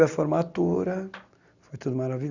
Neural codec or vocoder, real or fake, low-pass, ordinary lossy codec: none; real; 7.2 kHz; Opus, 64 kbps